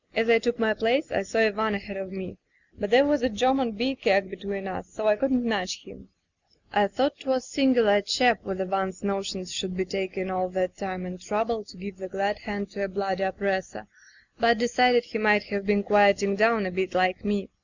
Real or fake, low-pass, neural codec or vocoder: real; 7.2 kHz; none